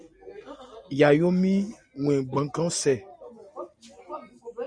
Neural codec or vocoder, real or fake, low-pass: none; real; 9.9 kHz